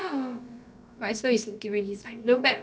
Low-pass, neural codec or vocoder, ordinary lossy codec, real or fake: none; codec, 16 kHz, about 1 kbps, DyCAST, with the encoder's durations; none; fake